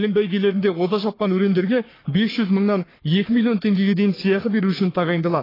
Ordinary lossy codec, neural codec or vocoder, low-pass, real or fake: AAC, 24 kbps; codec, 44.1 kHz, 3.4 kbps, Pupu-Codec; 5.4 kHz; fake